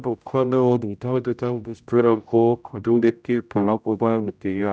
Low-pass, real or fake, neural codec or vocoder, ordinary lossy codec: none; fake; codec, 16 kHz, 0.5 kbps, X-Codec, HuBERT features, trained on general audio; none